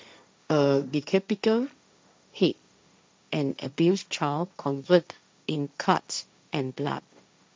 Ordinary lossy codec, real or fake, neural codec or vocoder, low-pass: none; fake; codec, 16 kHz, 1.1 kbps, Voila-Tokenizer; none